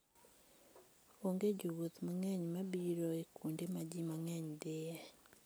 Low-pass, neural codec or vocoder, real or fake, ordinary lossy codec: none; none; real; none